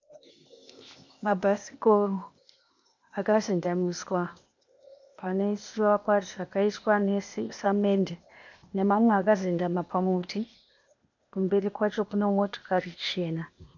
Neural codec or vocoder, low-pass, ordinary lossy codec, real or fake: codec, 16 kHz, 0.8 kbps, ZipCodec; 7.2 kHz; MP3, 64 kbps; fake